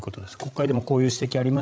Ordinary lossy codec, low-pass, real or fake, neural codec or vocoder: none; none; fake; codec, 16 kHz, 16 kbps, FreqCodec, larger model